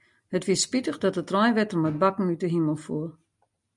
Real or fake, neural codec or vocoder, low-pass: real; none; 10.8 kHz